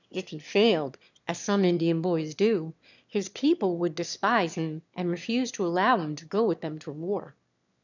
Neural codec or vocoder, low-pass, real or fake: autoencoder, 22.05 kHz, a latent of 192 numbers a frame, VITS, trained on one speaker; 7.2 kHz; fake